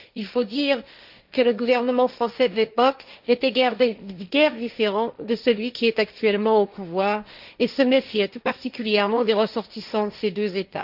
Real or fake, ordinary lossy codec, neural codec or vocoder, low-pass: fake; none; codec, 16 kHz, 1.1 kbps, Voila-Tokenizer; 5.4 kHz